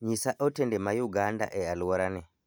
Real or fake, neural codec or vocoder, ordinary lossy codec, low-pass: real; none; none; none